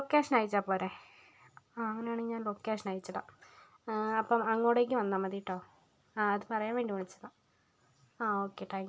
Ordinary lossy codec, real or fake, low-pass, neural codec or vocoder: none; real; none; none